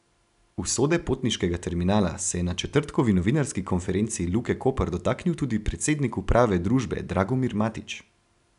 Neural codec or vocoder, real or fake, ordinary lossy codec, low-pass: none; real; none; 10.8 kHz